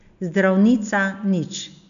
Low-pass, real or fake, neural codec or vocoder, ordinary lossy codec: 7.2 kHz; real; none; none